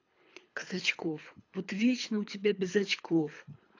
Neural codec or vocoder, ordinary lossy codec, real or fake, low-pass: codec, 24 kHz, 3 kbps, HILCodec; AAC, 48 kbps; fake; 7.2 kHz